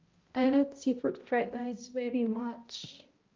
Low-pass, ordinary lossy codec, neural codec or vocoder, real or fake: 7.2 kHz; Opus, 24 kbps; codec, 16 kHz, 0.5 kbps, X-Codec, HuBERT features, trained on balanced general audio; fake